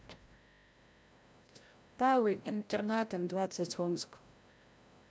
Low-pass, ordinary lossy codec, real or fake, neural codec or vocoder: none; none; fake; codec, 16 kHz, 0.5 kbps, FreqCodec, larger model